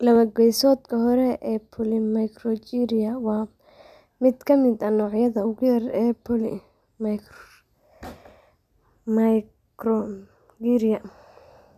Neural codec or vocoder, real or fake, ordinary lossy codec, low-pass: none; real; none; 14.4 kHz